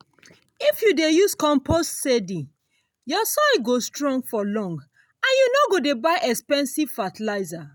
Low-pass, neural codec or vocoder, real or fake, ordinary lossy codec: none; none; real; none